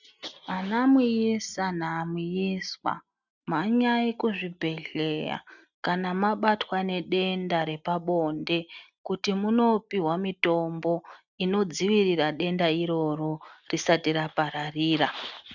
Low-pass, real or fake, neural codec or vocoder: 7.2 kHz; real; none